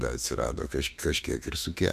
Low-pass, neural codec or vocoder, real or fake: 14.4 kHz; autoencoder, 48 kHz, 32 numbers a frame, DAC-VAE, trained on Japanese speech; fake